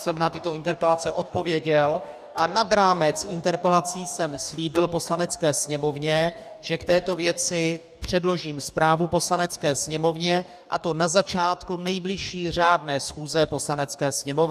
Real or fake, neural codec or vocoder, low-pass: fake; codec, 44.1 kHz, 2.6 kbps, DAC; 14.4 kHz